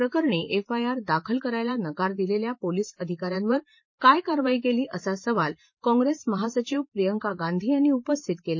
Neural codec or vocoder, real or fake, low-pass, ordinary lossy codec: vocoder, 44.1 kHz, 128 mel bands every 512 samples, BigVGAN v2; fake; 7.2 kHz; MP3, 32 kbps